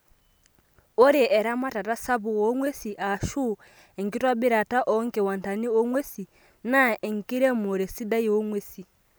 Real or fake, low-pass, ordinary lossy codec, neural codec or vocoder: real; none; none; none